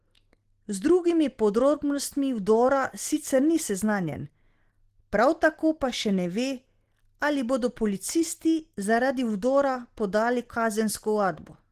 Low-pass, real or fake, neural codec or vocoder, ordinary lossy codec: 14.4 kHz; real; none; Opus, 16 kbps